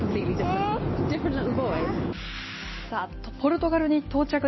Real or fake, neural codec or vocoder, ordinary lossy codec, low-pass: real; none; MP3, 24 kbps; 7.2 kHz